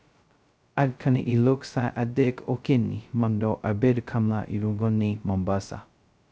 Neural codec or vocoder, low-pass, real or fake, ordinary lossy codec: codec, 16 kHz, 0.2 kbps, FocalCodec; none; fake; none